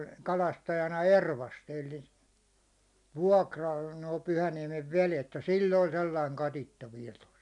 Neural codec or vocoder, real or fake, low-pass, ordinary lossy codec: none; real; 10.8 kHz; none